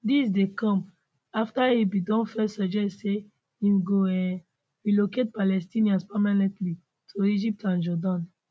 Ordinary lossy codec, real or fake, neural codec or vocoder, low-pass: none; real; none; none